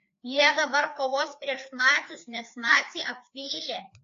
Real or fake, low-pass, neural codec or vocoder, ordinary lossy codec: fake; 7.2 kHz; codec, 16 kHz, 2 kbps, FreqCodec, larger model; AAC, 48 kbps